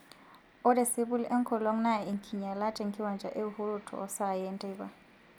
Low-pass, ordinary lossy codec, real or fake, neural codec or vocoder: none; none; real; none